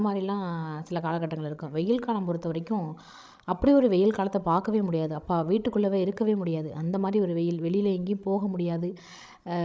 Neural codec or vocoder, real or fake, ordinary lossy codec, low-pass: codec, 16 kHz, 16 kbps, FreqCodec, larger model; fake; none; none